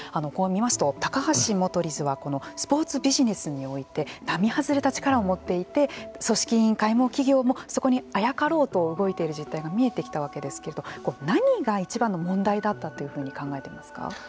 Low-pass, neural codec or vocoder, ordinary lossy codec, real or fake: none; none; none; real